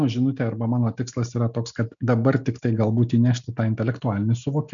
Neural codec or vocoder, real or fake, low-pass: none; real; 7.2 kHz